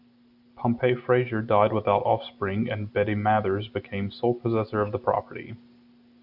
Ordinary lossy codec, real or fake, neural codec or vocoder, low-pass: AAC, 48 kbps; real; none; 5.4 kHz